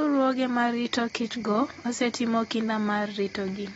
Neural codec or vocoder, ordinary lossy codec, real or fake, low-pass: none; AAC, 24 kbps; real; 19.8 kHz